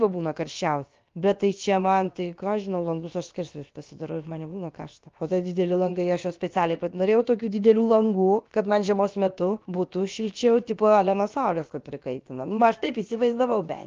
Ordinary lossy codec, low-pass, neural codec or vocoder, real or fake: Opus, 32 kbps; 7.2 kHz; codec, 16 kHz, about 1 kbps, DyCAST, with the encoder's durations; fake